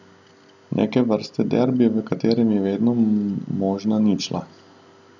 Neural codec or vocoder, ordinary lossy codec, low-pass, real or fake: none; none; 7.2 kHz; real